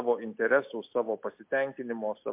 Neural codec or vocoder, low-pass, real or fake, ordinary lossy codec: none; 3.6 kHz; real; MP3, 32 kbps